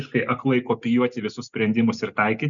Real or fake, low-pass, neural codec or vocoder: real; 7.2 kHz; none